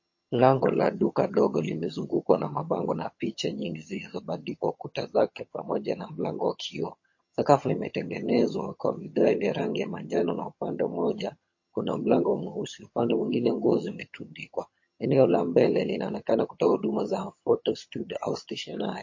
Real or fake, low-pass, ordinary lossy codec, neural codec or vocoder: fake; 7.2 kHz; MP3, 32 kbps; vocoder, 22.05 kHz, 80 mel bands, HiFi-GAN